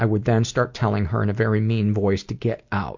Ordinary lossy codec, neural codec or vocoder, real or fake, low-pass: MP3, 48 kbps; none; real; 7.2 kHz